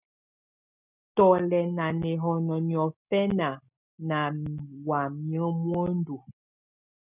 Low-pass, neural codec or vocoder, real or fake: 3.6 kHz; none; real